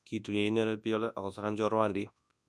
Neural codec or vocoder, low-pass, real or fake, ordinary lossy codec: codec, 24 kHz, 0.9 kbps, WavTokenizer, large speech release; none; fake; none